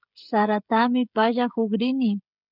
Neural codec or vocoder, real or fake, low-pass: codec, 16 kHz, 16 kbps, FreqCodec, smaller model; fake; 5.4 kHz